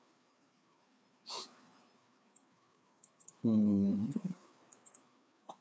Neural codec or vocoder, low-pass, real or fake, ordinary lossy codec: codec, 16 kHz, 2 kbps, FreqCodec, larger model; none; fake; none